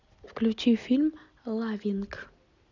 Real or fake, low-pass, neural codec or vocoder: real; 7.2 kHz; none